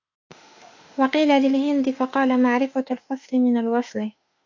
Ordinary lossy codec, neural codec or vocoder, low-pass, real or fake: AAC, 48 kbps; autoencoder, 48 kHz, 32 numbers a frame, DAC-VAE, trained on Japanese speech; 7.2 kHz; fake